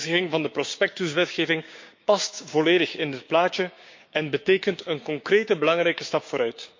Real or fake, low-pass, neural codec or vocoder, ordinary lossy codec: fake; 7.2 kHz; codec, 16 kHz, 6 kbps, DAC; MP3, 48 kbps